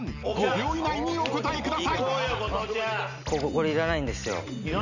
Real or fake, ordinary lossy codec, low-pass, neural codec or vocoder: real; none; 7.2 kHz; none